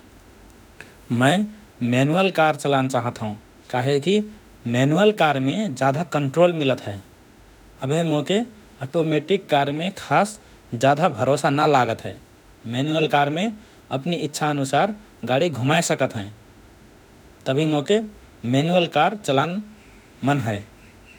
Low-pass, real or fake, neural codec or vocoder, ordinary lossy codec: none; fake; autoencoder, 48 kHz, 32 numbers a frame, DAC-VAE, trained on Japanese speech; none